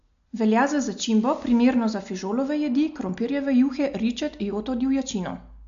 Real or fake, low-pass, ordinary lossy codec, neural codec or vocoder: real; 7.2 kHz; MP3, 64 kbps; none